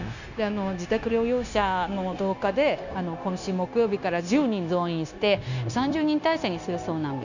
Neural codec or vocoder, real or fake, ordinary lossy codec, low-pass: codec, 16 kHz, 0.9 kbps, LongCat-Audio-Codec; fake; none; 7.2 kHz